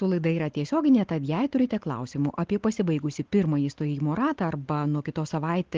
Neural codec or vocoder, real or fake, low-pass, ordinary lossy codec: none; real; 7.2 kHz; Opus, 16 kbps